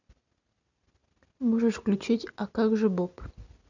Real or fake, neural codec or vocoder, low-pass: real; none; 7.2 kHz